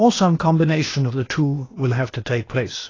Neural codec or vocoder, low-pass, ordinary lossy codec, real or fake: codec, 16 kHz, 0.8 kbps, ZipCodec; 7.2 kHz; AAC, 32 kbps; fake